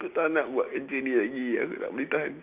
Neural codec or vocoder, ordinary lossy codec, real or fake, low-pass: none; Opus, 64 kbps; real; 3.6 kHz